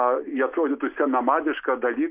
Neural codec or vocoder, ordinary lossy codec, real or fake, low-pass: none; MP3, 32 kbps; real; 3.6 kHz